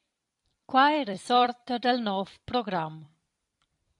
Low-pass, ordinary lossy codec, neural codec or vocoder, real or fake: 10.8 kHz; AAC, 64 kbps; vocoder, 44.1 kHz, 128 mel bands every 256 samples, BigVGAN v2; fake